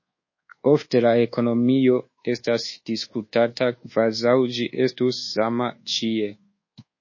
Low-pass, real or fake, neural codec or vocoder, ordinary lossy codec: 7.2 kHz; fake; codec, 24 kHz, 1.2 kbps, DualCodec; MP3, 32 kbps